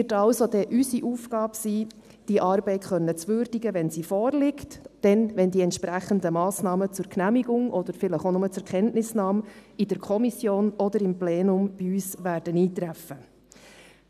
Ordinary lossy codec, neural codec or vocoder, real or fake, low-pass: none; none; real; 14.4 kHz